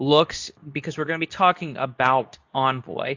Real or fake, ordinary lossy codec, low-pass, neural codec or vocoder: fake; AAC, 48 kbps; 7.2 kHz; codec, 24 kHz, 0.9 kbps, WavTokenizer, medium speech release version 2